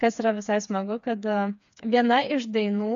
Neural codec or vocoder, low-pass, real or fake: codec, 16 kHz, 4 kbps, FreqCodec, smaller model; 7.2 kHz; fake